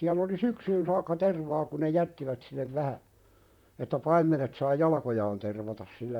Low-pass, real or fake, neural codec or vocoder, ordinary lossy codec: 19.8 kHz; fake; vocoder, 44.1 kHz, 128 mel bands, Pupu-Vocoder; none